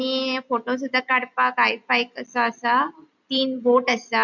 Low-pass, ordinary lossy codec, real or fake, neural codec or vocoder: 7.2 kHz; none; real; none